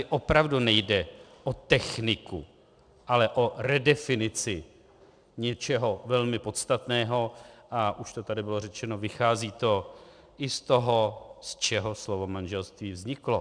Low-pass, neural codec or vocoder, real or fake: 9.9 kHz; none; real